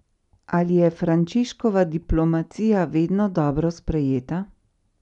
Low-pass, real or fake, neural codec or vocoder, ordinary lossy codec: 10.8 kHz; real; none; none